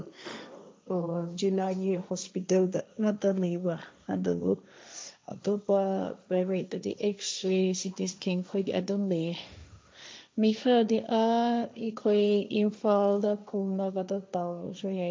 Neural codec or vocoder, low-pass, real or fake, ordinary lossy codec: codec, 16 kHz, 1.1 kbps, Voila-Tokenizer; none; fake; none